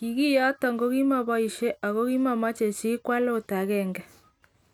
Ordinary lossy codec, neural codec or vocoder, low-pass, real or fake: none; none; 19.8 kHz; real